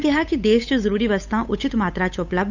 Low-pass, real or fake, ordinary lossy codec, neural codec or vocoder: 7.2 kHz; fake; none; codec, 16 kHz, 8 kbps, FunCodec, trained on Chinese and English, 25 frames a second